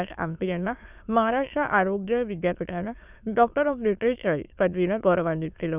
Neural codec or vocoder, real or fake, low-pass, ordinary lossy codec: autoencoder, 22.05 kHz, a latent of 192 numbers a frame, VITS, trained on many speakers; fake; 3.6 kHz; none